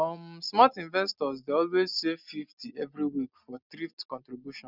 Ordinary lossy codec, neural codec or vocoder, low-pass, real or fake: none; none; 5.4 kHz; real